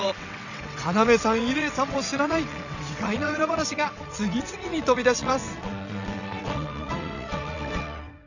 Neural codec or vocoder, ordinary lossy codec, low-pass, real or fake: vocoder, 22.05 kHz, 80 mel bands, WaveNeXt; none; 7.2 kHz; fake